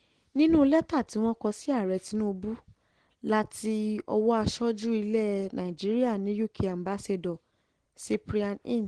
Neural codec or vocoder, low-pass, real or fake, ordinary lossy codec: none; 9.9 kHz; real; Opus, 16 kbps